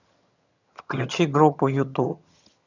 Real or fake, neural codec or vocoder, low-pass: fake; vocoder, 22.05 kHz, 80 mel bands, HiFi-GAN; 7.2 kHz